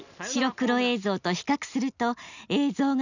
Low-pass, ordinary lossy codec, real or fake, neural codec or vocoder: 7.2 kHz; none; real; none